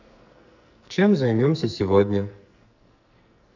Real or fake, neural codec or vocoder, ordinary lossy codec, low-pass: fake; codec, 44.1 kHz, 2.6 kbps, SNAC; none; 7.2 kHz